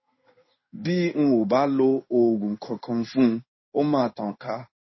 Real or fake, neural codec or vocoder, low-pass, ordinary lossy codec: fake; codec, 16 kHz in and 24 kHz out, 1 kbps, XY-Tokenizer; 7.2 kHz; MP3, 24 kbps